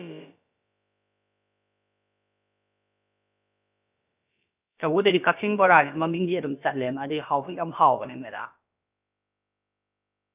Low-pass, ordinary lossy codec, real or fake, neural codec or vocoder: 3.6 kHz; none; fake; codec, 16 kHz, about 1 kbps, DyCAST, with the encoder's durations